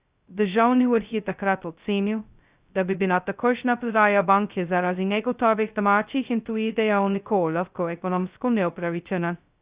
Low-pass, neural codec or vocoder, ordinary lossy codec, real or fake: 3.6 kHz; codec, 16 kHz, 0.2 kbps, FocalCodec; Opus, 64 kbps; fake